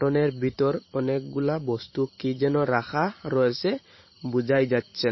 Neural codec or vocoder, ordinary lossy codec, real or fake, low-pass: vocoder, 44.1 kHz, 128 mel bands every 512 samples, BigVGAN v2; MP3, 24 kbps; fake; 7.2 kHz